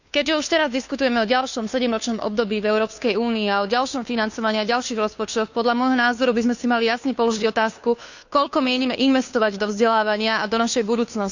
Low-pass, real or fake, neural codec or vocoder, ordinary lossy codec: 7.2 kHz; fake; codec, 16 kHz, 2 kbps, FunCodec, trained on Chinese and English, 25 frames a second; none